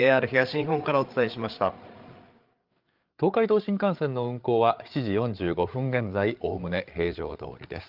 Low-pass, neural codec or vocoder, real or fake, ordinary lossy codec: 5.4 kHz; codec, 16 kHz, 8 kbps, FreqCodec, larger model; fake; Opus, 32 kbps